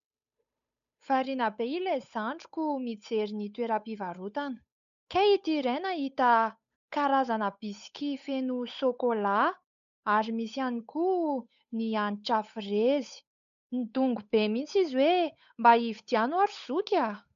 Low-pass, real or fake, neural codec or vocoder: 7.2 kHz; fake; codec, 16 kHz, 8 kbps, FunCodec, trained on Chinese and English, 25 frames a second